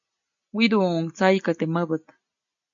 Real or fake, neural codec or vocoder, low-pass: real; none; 7.2 kHz